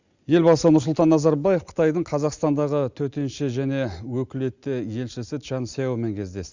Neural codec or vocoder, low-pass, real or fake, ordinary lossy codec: none; 7.2 kHz; real; Opus, 64 kbps